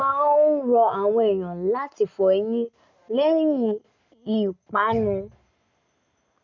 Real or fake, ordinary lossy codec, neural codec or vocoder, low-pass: real; none; none; 7.2 kHz